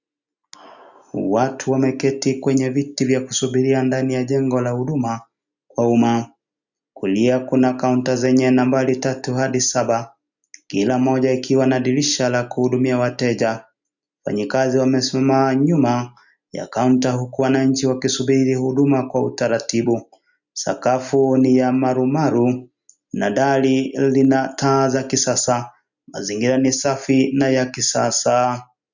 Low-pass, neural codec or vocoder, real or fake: 7.2 kHz; none; real